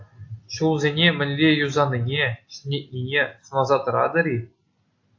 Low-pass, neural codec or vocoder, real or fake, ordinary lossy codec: 7.2 kHz; none; real; AAC, 48 kbps